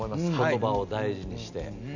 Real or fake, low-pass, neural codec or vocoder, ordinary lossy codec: real; 7.2 kHz; none; none